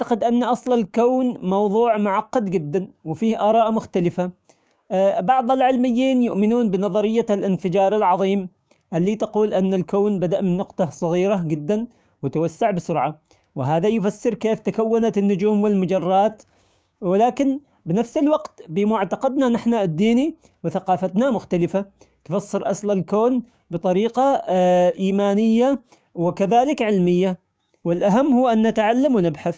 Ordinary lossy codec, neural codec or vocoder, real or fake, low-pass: none; codec, 16 kHz, 6 kbps, DAC; fake; none